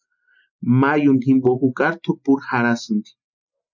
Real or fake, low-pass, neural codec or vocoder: real; 7.2 kHz; none